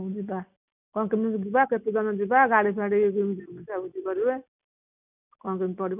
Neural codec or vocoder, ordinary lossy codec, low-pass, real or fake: none; none; 3.6 kHz; real